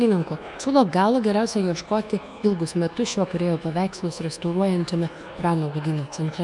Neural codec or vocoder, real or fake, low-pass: codec, 24 kHz, 1.2 kbps, DualCodec; fake; 10.8 kHz